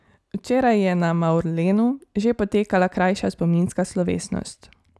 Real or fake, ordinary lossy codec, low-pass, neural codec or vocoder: real; none; none; none